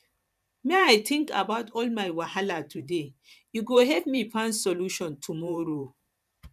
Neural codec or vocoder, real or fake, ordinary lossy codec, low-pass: vocoder, 44.1 kHz, 128 mel bands every 512 samples, BigVGAN v2; fake; none; 14.4 kHz